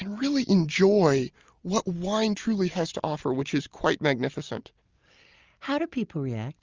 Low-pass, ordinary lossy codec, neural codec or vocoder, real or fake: 7.2 kHz; Opus, 32 kbps; none; real